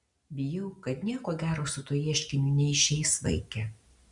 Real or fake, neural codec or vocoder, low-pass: real; none; 10.8 kHz